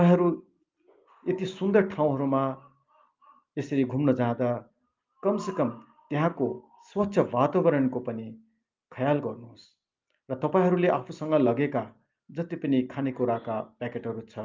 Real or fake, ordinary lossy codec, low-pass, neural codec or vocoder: real; Opus, 24 kbps; 7.2 kHz; none